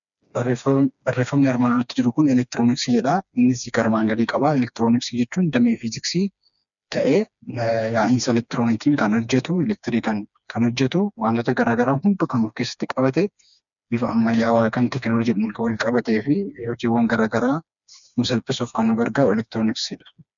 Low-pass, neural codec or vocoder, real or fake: 7.2 kHz; codec, 16 kHz, 2 kbps, FreqCodec, smaller model; fake